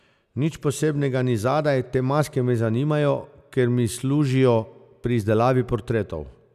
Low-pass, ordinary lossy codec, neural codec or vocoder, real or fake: 14.4 kHz; none; none; real